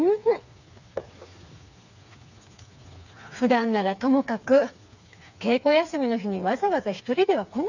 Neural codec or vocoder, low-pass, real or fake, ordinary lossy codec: codec, 16 kHz, 4 kbps, FreqCodec, smaller model; 7.2 kHz; fake; none